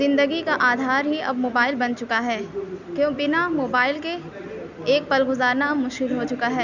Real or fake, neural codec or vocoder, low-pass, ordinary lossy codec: real; none; 7.2 kHz; none